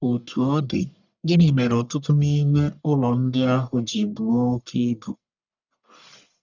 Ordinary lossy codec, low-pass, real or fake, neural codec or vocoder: none; 7.2 kHz; fake; codec, 44.1 kHz, 1.7 kbps, Pupu-Codec